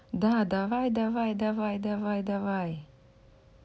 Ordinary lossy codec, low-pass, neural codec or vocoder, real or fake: none; none; none; real